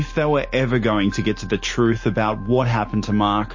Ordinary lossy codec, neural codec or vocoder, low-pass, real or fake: MP3, 32 kbps; none; 7.2 kHz; real